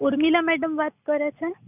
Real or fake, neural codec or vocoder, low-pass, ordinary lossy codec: real; none; 3.6 kHz; none